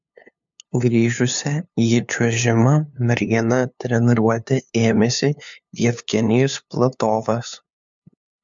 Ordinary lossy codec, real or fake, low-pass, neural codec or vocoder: MP3, 64 kbps; fake; 7.2 kHz; codec, 16 kHz, 2 kbps, FunCodec, trained on LibriTTS, 25 frames a second